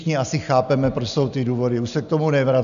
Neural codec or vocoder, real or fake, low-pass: none; real; 7.2 kHz